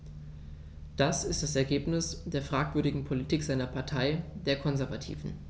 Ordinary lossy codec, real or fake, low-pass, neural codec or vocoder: none; real; none; none